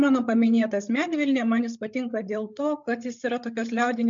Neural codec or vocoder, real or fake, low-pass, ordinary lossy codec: codec, 16 kHz, 8 kbps, FreqCodec, larger model; fake; 7.2 kHz; MP3, 64 kbps